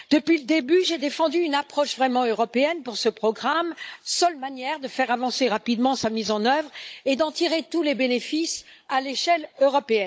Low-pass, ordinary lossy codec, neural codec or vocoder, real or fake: none; none; codec, 16 kHz, 16 kbps, FunCodec, trained on Chinese and English, 50 frames a second; fake